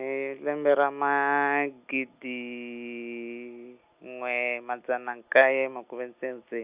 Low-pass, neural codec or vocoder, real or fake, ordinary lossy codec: 3.6 kHz; none; real; Opus, 64 kbps